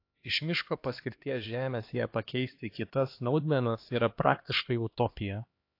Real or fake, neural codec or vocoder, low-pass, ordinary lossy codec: fake; codec, 16 kHz, 2 kbps, X-Codec, HuBERT features, trained on LibriSpeech; 5.4 kHz; AAC, 32 kbps